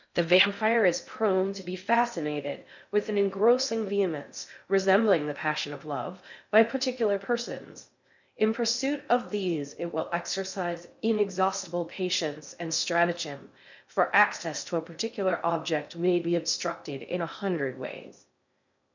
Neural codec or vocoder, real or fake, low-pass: codec, 16 kHz in and 24 kHz out, 0.6 kbps, FocalCodec, streaming, 4096 codes; fake; 7.2 kHz